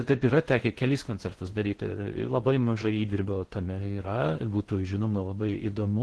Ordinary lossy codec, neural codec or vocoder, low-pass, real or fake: Opus, 16 kbps; codec, 16 kHz in and 24 kHz out, 0.6 kbps, FocalCodec, streaming, 4096 codes; 10.8 kHz; fake